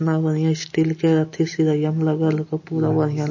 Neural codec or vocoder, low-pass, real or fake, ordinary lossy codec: none; 7.2 kHz; real; MP3, 32 kbps